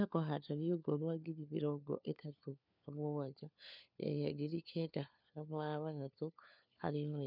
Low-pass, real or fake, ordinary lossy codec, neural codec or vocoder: 5.4 kHz; fake; none; codec, 16 kHz, 4 kbps, FunCodec, trained on LibriTTS, 50 frames a second